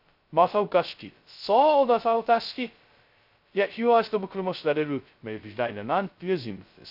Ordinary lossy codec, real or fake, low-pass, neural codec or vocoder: none; fake; 5.4 kHz; codec, 16 kHz, 0.2 kbps, FocalCodec